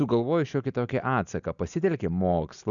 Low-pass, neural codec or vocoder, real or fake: 7.2 kHz; none; real